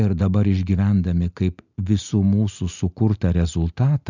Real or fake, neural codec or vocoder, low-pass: real; none; 7.2 kHz